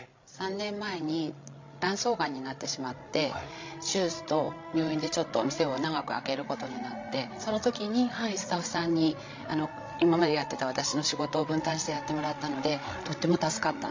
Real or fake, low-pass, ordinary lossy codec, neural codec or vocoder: fake; 7.2 kHz; MP3, 64 kbps; codec, 16 kHz, 16 kbps, FreqCodec, larger model